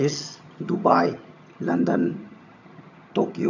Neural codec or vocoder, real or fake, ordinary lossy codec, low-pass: vocoder, 22.05 kHz, 80 mel bands, HiFi-GAN; fake; none; 7.2 kHz